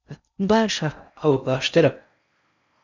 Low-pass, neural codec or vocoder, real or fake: 7.2 kHz; codec, 16 kHz in and 24 kHz out, 0.6 kbps, FocalCodec, streaming, 4096 codes; fake